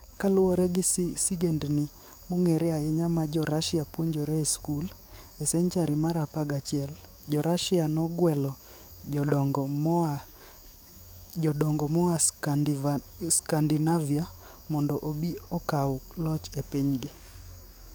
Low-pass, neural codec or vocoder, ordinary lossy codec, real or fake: none; codec, 44.1 kHz, 7.8 kbps, DAC; none; fake